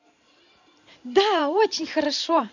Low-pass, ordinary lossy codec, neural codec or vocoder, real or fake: 7.2 kHz; none; vocoder, 44.1 kHz, 128 mel bands every 512 samples, BigVGAN v2; fake